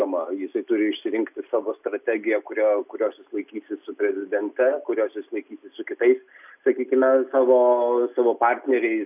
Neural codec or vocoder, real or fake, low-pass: none; real; 3.6 kHz